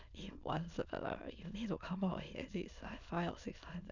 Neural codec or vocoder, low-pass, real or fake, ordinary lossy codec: autoencoder, 22.05 kHz, a latent of 192 numbers a frame, VITS, trained on many speakers; 7.2 kHz; fake; none